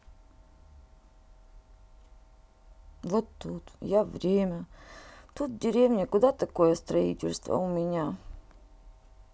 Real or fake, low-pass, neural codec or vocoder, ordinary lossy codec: real; none; none; none